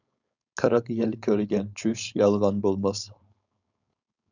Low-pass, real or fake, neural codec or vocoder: 7.2 kHz; fake; codec, 16 kHz, 4.8 kbps, FACodec